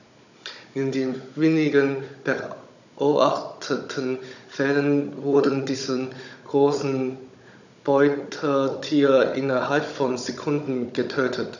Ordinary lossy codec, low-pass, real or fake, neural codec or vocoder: none; 7.2 kHz; fake; codec, 16 kHz, 16 kbps, FunCodec, trained on Chinese and English, 50 frames a second